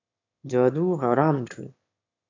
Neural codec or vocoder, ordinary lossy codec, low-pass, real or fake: autoencoder, 22.05 kHz, a latent of 192 numbers a frame, VITS, trained on one speaker; AAC, 48 kbps; 7.2 kHz; fake